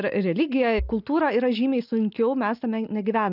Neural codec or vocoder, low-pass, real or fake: none; 5.4 kHz; real